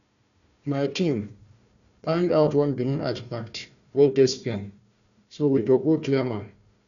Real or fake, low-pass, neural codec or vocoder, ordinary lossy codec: fake; 7.2 kHz; codec, 16 kHz, 1 kbps, FunCodec, trained on Chinese and English, 50 frames a second; none